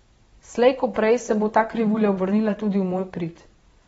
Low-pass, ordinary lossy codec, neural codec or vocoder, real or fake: 9.9 kHz; AAC, 24 kbps; vocoder, 22.05 kHz, 80 mel bands, Vocos; fake